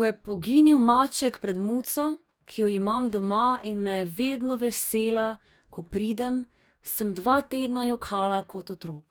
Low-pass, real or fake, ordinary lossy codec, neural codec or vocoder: none; fake; none; codec, 44.1 kHz, 2.6 kbps, DAC